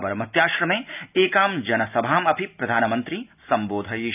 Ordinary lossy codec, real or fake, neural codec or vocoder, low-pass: none; real; none; 3.6 kHz